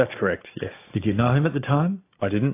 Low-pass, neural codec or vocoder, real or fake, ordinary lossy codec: 3.6 kHz; none; real; AAC, 24 kbps